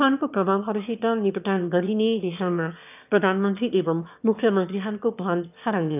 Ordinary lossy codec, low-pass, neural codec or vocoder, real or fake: none; 3.6 kHz; autoencoder, 22.05 kHz, a latent of 192 numbers a frame, VITS, trained on one speaker; fake